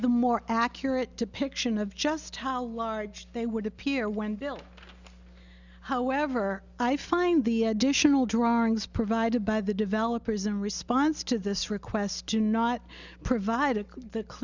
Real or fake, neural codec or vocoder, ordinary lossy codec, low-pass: real; none; Opus, 64 kbps; 7.2 kHz